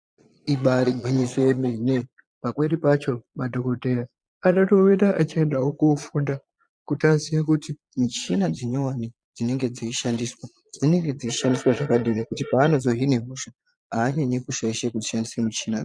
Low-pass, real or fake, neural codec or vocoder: 9.9 kHz; fake; vocoder, 22.05 kHz, 80 mel bands, Vocos